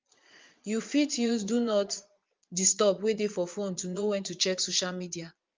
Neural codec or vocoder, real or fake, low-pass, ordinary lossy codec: vocoder, 22.05 kHz, 80 mel bands, Vocos; fake; 7.2 kHz; Opus, 24 kbps